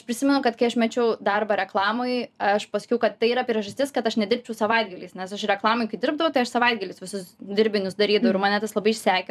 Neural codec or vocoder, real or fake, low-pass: vocoder, 44.1 kHz, 128 mel bands every 256 samples, BigVGAN v2; fake; 14.4 kHz